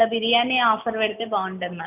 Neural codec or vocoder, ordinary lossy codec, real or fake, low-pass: none; none; real; 3.6 kHz